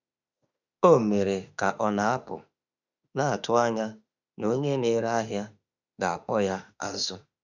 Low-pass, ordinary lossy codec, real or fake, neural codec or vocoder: 7.2 kHz; none; fake; autoencoder, 48 kHz, 32 numbers a frame, DAC-VAE, trained on Japanese speech